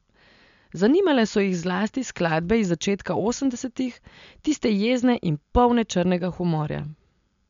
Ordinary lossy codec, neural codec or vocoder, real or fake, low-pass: MP3, 64 kbps; none; real; 7.2 kHz